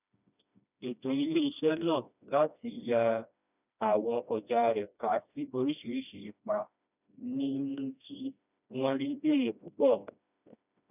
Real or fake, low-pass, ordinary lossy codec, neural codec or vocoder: fake; 3.6 kHz; none; codec, 16 kHz, 1 kbps, FreqCodec, smaller model